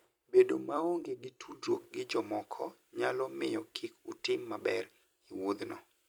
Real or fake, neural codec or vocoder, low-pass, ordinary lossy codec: fake; vocoder, 44.1 kHz, 128 mel bands every 256 samples, BigVGAN v2; none; none